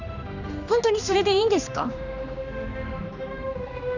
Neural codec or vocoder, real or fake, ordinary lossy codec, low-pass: codec, 16 kHz, 2 kbps, X-Codec, HuBERT features, trained on general audio; fake; none; 7.2 kHz